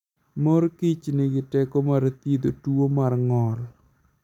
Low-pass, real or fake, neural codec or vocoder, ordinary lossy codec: 19.8 kHz; real; none; none